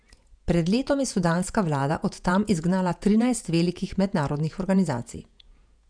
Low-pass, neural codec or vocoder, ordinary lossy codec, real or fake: 9.9 kHz; vocoder, 48 kHz, 128 mel bands, Vocos; none; fake